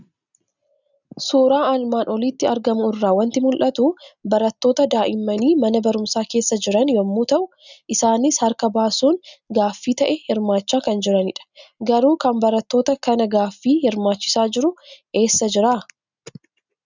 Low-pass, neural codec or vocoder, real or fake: 7.2 kHz; none; real